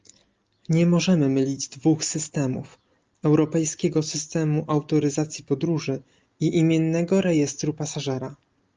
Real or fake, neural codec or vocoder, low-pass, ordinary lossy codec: real; none; 7.2 kHz; Opus, 32 kbps